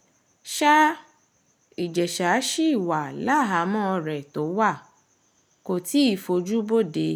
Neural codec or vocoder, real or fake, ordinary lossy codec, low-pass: none; real; none; none